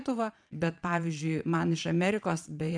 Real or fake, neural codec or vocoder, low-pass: fake; vocoder, 22.05 kHz, 80 mel bands, Vocos; 9.9 kHz